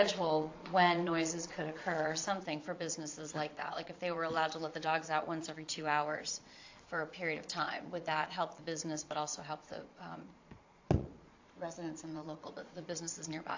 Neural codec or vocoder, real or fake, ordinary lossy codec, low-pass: vocoder, 22.05 kHz, 80 mel bands, Vocos; fake; AAC, 48 kbps; 7.2 kHz